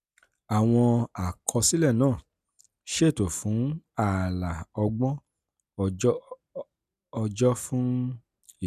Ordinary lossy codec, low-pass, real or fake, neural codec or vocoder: none; 14.4 kHz; real; none